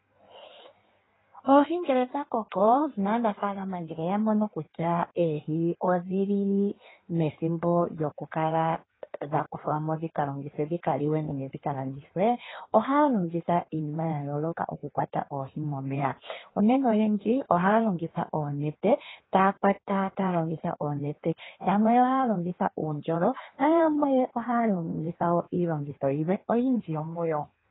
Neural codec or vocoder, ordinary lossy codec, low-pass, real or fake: codec, 16 kHz in and 24 kHz out, 1.1 kbps, FireRedTTS-2 codec; AAC, 16 kbps; 7.2 kHz; fake